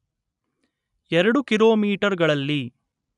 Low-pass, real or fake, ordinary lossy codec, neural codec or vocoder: 10.8 kHz; real; none; none